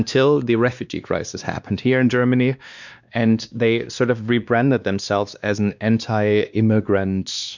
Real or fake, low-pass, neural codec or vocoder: fake; 7.2 kHz; codec, 16 kHz, 2 kbps, X-Codec, WavLM features, trained on Multilingual LibriSpeech